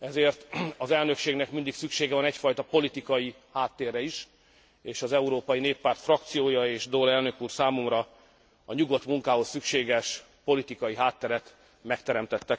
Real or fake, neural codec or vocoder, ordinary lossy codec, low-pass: real; none; none; none